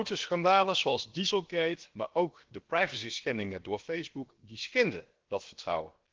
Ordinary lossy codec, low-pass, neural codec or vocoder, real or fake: Opus, 16 kbps; 7.2 kHz; codec, 16 kHz, about 1 kbps, DyCAST, with the encoder's durations; fake